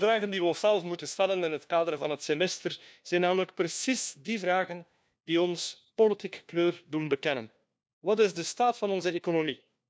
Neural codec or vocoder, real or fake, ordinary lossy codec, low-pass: codec, 16 kHz, 1 kbps, FunCodec, trained on LibriTTS, 50 frames a second; fake; none; none